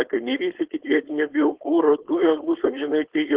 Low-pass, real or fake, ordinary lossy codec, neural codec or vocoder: 3.6 kHz; fake; Opus, 16 kbps; codec, 16 kHz, 4.8 kbps, FACodec